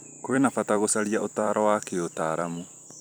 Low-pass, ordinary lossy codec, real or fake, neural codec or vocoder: none; none; fake; vocoder, 44.1 kHz, 128 mel bands every 256 samples, BigVGAN v2